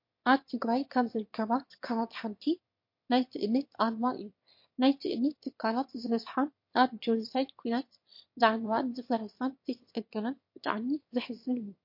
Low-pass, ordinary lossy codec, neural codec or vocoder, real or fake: 5.4 kHz; MP3, 32 kbps; autoencoder, 22.05 kHz, a latent of 192 numbers a frame, VITS, trained on one speaker; fake